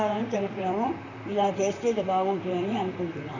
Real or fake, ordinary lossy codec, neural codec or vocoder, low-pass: fake; none; vocoder, 44.1 kHz, 128 mel bands, Pupu-Vocoder; 7.2 kHz